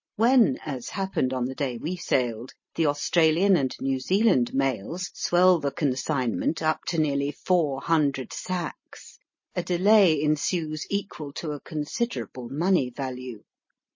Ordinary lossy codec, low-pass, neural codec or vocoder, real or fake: MP3, 32 kbps; 7.2 kHz; none; real